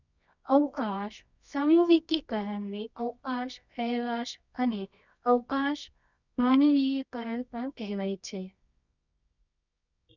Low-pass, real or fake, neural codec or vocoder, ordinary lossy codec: 7.2 kHz; fake; codec, 24 kHz, 0.9 kbps, WavTokenizer, medium music audio release; none